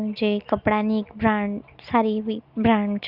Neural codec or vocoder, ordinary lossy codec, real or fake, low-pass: none; Opus, 64 kbps; real; 5.4 kHz